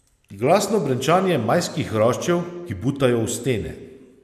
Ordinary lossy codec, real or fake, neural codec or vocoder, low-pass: none; real; none; 14.4 kHz